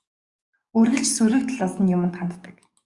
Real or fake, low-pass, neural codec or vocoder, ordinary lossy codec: fake; 10.8 kHz; codec, 44.1 kHz, 7.8 kbps, DAC; Opus, 64 kbps